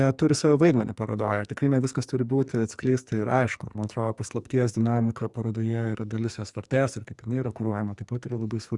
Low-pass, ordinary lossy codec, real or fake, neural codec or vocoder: 10.8 kHz; Opus, 64 kbps; fake; codec, 44.1 kHz, 2.6 kbps, SNAC